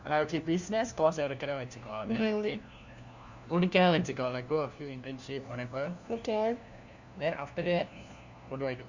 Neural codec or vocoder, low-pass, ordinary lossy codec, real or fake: codec, 16 kHz, 1 kbps, FunCodec, trained on LibriTTS, 50 frames a second; 7.2 kHz; Opus, 64 kbps; fake